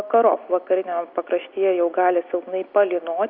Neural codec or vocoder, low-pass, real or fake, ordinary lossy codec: none; 5.4 kHz; real; Opus, 64 kbps